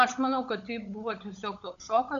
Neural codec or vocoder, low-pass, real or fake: codec, 16 kHz, 16 kbps, FunCodec, trained on LibriTTS, 50 frames a second; 7.2 kHz; fake